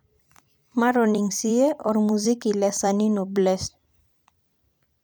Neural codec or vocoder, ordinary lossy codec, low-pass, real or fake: vocoder, 44.1 kHz, 128 mel bands every 256 samples, BigVGAN v2; none; none; fake